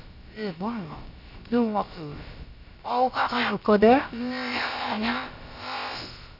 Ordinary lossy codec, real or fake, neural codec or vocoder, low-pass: none; fake; codec, 16 kHz, about 1 kbps, DyCAST, with the encoder's durations; 5.4 kHz